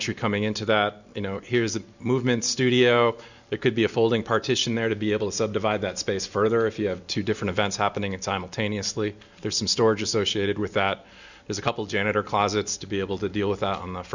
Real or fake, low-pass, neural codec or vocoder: real; 7.2 kHz; none